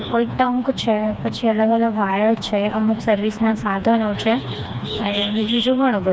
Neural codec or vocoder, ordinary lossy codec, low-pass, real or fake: codec, 16 kHz, 2 kbps, FreqCodec, smaller model; none; none; fake